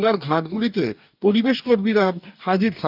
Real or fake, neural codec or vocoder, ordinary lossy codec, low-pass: fake; codec, 44.1 kHz, 2.6 kbps, DAC; none; 5.4 kHz